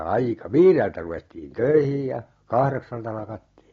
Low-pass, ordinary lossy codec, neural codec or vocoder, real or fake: 7.2 kHz; AAC, 32 kbps; none; real